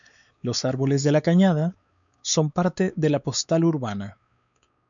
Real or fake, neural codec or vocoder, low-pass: fake; codec, 16 kHz, 4 kbps, X-Codec, WavLM features, trained on Multilingual LibriSpeech; 7.2 kHz